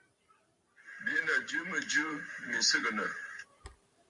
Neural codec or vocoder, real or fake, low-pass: vocoder, 44.1 kHz, 128 mel bands every 512 samples, BigVGAN v2; fake; 10.8 kHz